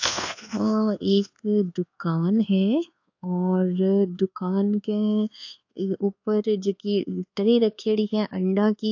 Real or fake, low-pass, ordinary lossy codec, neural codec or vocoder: fake; 7.2 kHz; AAC, 48 kbps; codec, 24 kHz, 1.2 kbps, DualCodec